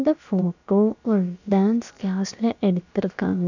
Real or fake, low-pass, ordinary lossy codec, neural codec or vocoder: fake; 7.2 kHz; none; codec, 16 kHz, about 1 kbps, DyCAST, with the encoder's durations